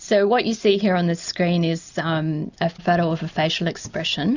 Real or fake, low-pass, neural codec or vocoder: real; 7.2 kHz; none